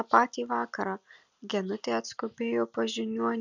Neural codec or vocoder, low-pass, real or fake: none; 7.2 kHz; real